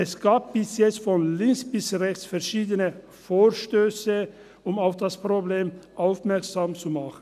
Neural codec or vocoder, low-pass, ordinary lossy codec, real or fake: none; 14.4 kHz; none; real